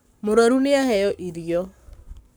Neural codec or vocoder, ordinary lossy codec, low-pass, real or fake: codec, 44.1 kHz, 7.8 kbps, Pupu-Codec; none; none; fake